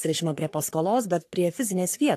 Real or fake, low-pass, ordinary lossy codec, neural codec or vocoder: fake; 14.4 kHz; AAC, 64 kbps; codec, 44.1 kHz, 3.4 kbps, Pupu-Codec